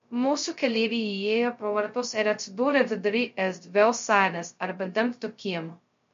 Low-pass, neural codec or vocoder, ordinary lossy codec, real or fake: 7.2 kHz; codec, 16 kHz, 0.2 kbps, FocalCodec; MP3, 48 kbps; fake